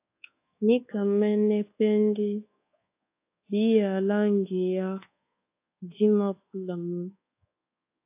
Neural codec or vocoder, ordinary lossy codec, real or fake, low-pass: codec, 24 kHz, 1.2 kbps, DualCodec; AAC, 24 kbps; fake; 3.6 kHz